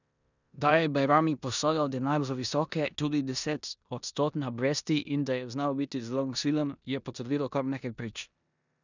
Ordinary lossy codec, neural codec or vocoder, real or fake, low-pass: none; codec, 16 kHz in and 24 kHz out, 0.9 kbps, LongCat-Audio-Codec, four codebook decoder; fake; 7.2 kHz